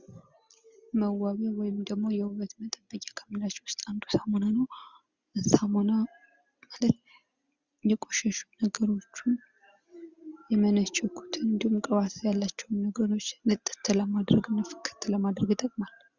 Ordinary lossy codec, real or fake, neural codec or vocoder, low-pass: Opus, 64 kbps; real; none; 7.2 kHz